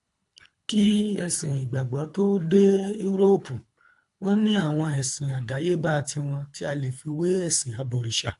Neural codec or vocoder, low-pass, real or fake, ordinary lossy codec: codec, 24 kHz, 3 kbps, HILCodec; 10.8 kHz; fake; AAC, 64 kbps